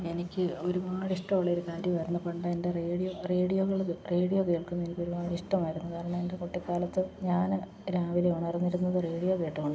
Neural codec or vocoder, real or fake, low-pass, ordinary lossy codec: none; real; none; none